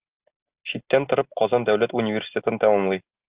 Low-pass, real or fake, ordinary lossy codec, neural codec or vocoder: 3.6 kHz; real; Opus, 32 kbps; none